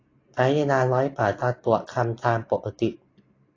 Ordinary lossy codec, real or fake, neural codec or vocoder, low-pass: AAC, 32 kbps; real; none; 7.2 kHz